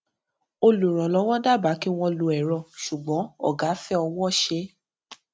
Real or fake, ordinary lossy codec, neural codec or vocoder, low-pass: real; none; none; none